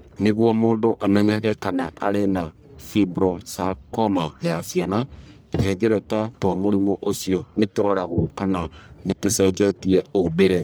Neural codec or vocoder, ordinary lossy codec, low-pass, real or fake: codec, 44.1 kHz, 1.7 kbps, Pupu-Codec; none; none; fake